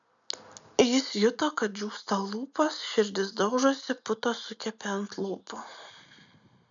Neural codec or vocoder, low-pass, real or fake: none; 7.2 kHz; real